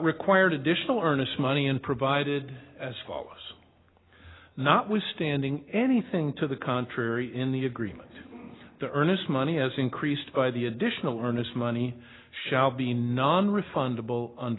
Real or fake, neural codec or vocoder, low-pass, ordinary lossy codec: real; none; 7.2 kHz; AAC, 16 kbps